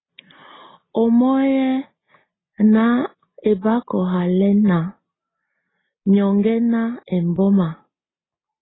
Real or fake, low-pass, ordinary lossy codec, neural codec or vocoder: real; 7.2 kHz; AAC, 16 kbps; none